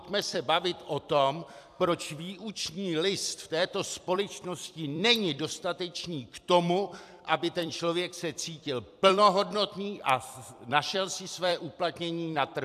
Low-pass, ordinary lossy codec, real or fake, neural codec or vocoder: 14.4 kHz; AAC, 96 kbps; fake; vocoder, 44.1 kHz, 128 mel bands every 256 samples, BigVGAN v2